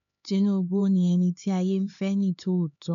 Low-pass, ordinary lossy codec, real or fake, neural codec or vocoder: 7.2 kHz; none; fake; codec, 16 kHz, 4 kbps, X-Codec, HuBERT features, trained on LibriSpeech